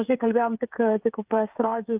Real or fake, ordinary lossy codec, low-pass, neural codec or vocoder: fake; Opus, 24 kbps; 3.6 kHz; codec, 16 kHz, 8 kbps, FreqCodec, smaller model